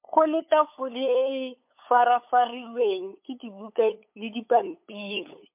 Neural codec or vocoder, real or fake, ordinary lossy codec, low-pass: codec, 16 kHz, 8 kbps, FunCodec, trained on LibriTTS, 25 frames a second; fake; MP3, 32 kbps; 3.6 kHz